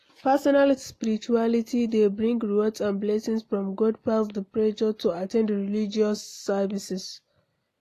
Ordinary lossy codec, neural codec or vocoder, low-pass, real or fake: AAC, 48 kbps; none; 14.4 kHz; real